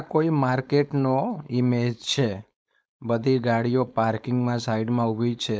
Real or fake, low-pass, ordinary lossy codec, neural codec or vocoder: fake; none; none; codec, 16 kHz, 4.8 kbps, FACodec